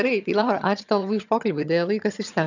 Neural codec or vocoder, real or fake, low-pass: vocoder, 22.05 kHz, 80 mel bands, HiFi-GAN; fake; 7.2 kHz